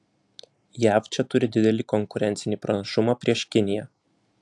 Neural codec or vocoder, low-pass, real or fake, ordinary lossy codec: none; 10.8 kHz; real; AAC, 64 kbps